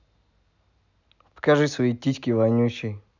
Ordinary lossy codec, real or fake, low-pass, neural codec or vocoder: none; real; 7.2 kHz; none